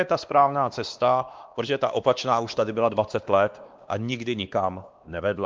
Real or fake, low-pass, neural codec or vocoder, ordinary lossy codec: fake; 7.2 kHz; codec, 16 kHz, 2 kbps, X-Codec, WavLM features, trained on Multilingual LibriSpeech; Opus, 24 kbps